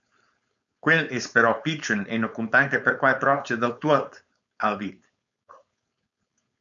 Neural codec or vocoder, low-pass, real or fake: codec, 16 kHz, 4.8 kbps, FACodec; 7.2 kHz; fake